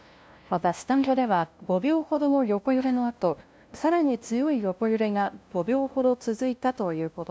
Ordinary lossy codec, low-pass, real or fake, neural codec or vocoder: none; none; fake; codec, 16 kHz, 0.5 kbps, FunCodec, trained on LibriTTS, 25 frames a second